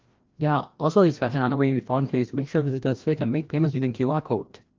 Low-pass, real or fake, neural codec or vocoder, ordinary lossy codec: 7.2 kHz; fake; codec, 16 kHz, 1 kbps, FreqCodec, larger model; Opus, 32 kbps